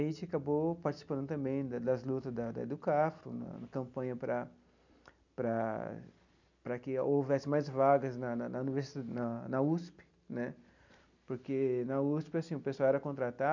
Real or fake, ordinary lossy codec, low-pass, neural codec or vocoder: real; none; 7.2 kHz; none